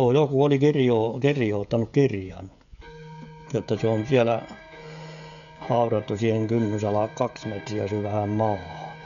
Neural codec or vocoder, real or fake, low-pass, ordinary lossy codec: codec, 16 kHz, 16 kbps, FreqCodec, smaller model; fake; 7.2 kHz; none